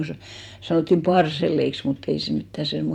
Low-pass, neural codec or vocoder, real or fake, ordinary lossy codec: 19.8 kHz; vocoder, 44.1 kHz, 128 mel bands every 512 samples, BigVGAN v2; fake; none